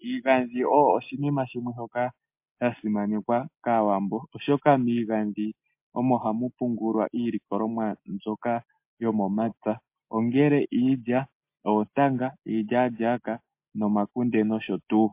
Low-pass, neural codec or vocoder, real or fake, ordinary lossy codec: 3.6 kHz; none; real; MP3, 32 kbps